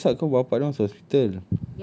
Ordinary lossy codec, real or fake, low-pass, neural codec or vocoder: none; real; none; none